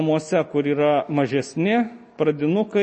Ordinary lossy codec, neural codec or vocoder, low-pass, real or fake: MP3, 32 kbps; none; 10.8 kHz; real